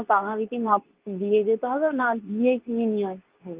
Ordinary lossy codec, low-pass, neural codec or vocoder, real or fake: Opus, 32 kbps; 3.6 kHz; codec, 24 kHz, 0.9 kbps, WavTokenizer, medium speech release version 2; fake